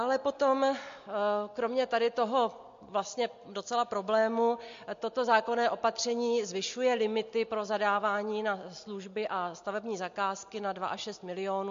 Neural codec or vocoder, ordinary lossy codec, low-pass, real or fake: none; MP3, 48 kbps; 7.2 kHz; real